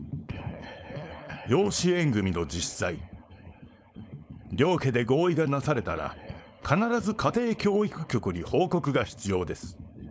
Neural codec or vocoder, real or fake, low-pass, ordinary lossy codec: codec, 16 kHz, 4.8 kbps, FACodec; fake; none; none